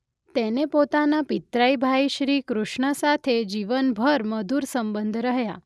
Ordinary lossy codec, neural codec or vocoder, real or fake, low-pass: none; none; real; none